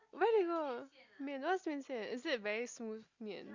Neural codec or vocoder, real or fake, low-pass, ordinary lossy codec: none; real; 7.2 kHz; Opus, 64 kbps